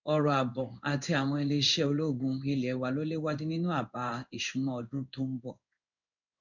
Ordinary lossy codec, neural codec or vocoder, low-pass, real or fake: none; codec, 16 kHz in and 24 kHz out, 1 kbps, XY-Tokenizer; 7.2 kHz; fake